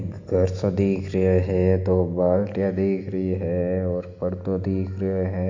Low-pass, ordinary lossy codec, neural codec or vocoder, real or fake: 7.2 kHz; MP3, 64 kbps; none; real